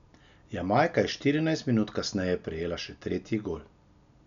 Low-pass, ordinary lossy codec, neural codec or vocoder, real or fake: 7.2 kHz; none; none; real